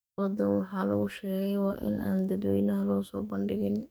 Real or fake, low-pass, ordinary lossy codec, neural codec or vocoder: fake; none; none; codec, 44.1 kHz, 2.6 kbps, SNAC